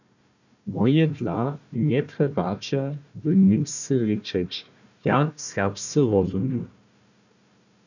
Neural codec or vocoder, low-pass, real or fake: codec, 16 kHz, 1 kbps, FunCodec, trained on Chinese and English, 50 frames a second; 7.2 kHz; fake